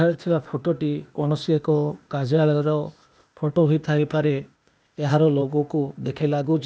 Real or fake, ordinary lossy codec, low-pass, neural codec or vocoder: fake; none; none; codec, 16 kHz, 0.8 kbps, ZipCodec